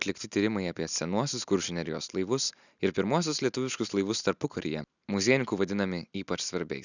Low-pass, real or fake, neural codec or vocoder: 7.2 kHz; real; none